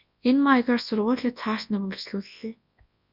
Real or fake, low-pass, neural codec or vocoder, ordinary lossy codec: fake; 5.4 kHz; codec, 24 kHz, 0.9 kbps, WavTokenizer, large speech release; Opus, 64 kbps